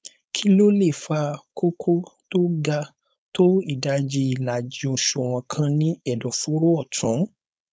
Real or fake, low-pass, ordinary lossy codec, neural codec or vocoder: fake; none; none; codec, 16 kHz, 4.8 kbps, FACodec